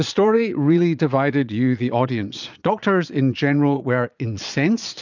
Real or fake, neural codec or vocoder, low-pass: fake; vocoder, 22.05 kHz, 80 mel bands, Vocos; 7.2 kHz